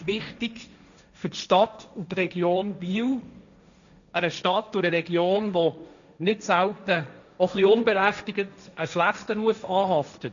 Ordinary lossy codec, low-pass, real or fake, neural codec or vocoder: none; 7.2 kHz; fake; codec, 16 kHz, 1.1 kbps, Voila-Tokenizer